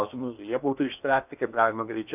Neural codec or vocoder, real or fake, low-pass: codec, 16 kHz in and 24 kHz out, 0.8 kbps, FocalCodec, streaming, 65536 codes; fake; 3.6 kHz